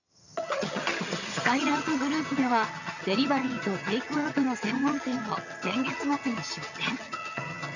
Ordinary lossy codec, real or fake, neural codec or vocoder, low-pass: none; fake; vocoder, 22.05 kHz, 80 mel bands, HiFi-GAN; 7.2 kHz